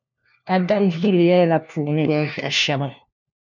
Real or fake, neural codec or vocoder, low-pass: fake; codec, 16 kHz, 1 kbps, FunCodec, trained on LibriTTS, 50 frames a second; 7.2 kHz